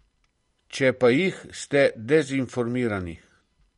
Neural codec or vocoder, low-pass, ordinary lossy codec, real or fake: vocoder, 44.1 kHz, 128 mel bands every 512 samples, BigVGAN v2; 19.8 kHz; MP3, 48 kbps; fake